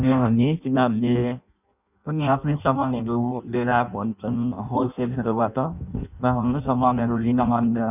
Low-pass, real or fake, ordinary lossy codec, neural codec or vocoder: 3.6 kHz; fake; none; codec, 16 kHz in and 24 kHz out, 0.6 kbps, FireRedTTS-2 codec